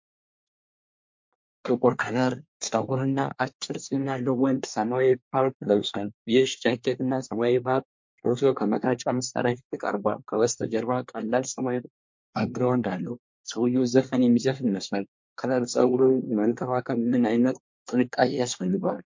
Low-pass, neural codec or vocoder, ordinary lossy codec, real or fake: 7.2 kHz; codec, 24 kHz, 1 kbps, SNAC; MP3, 48 kbps; fake